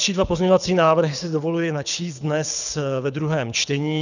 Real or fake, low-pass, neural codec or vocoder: fake; 7.2 kHz; codec, 24 kHz, 6 kbps, HILCodec